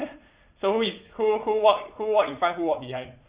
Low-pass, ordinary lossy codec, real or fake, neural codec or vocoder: 3.6 kHz; Opus, 64 kbps; fake; vocoder, 44.1 kHz, 80 mel bands, Vocos